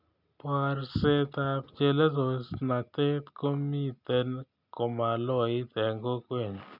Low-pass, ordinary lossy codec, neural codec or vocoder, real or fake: 5.4 kHz; none; none; real